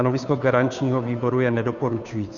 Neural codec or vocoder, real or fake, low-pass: codec, 16 kHz, 2 kbps, FunCodec, trained on Chinese and English, 25 frames a second; fake; 7.2 kHz